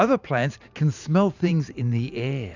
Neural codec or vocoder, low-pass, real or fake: vocoder, 44.1 kHz, 128 mel bands every 512 samples, BigVGAN v2; 7.2 kHz; fake